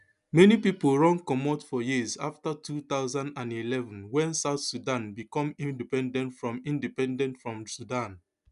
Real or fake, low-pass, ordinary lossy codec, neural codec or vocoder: real; 10.8 kHz; none; none